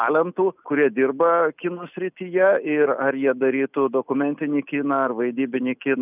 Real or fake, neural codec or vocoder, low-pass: real; none; 3.6 kHz